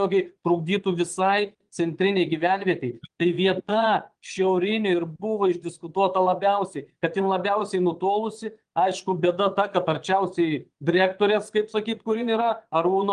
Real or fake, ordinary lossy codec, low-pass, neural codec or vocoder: fake; Opus, 24 kbps; 9.9 kHz; vocoder, 22.05 kHz, 80 mel bands, Vocos